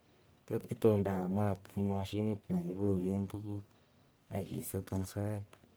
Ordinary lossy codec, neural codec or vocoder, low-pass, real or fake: none; codec, 44.1 kHz, 1.7 kbps, Pupu-Codec; none; fake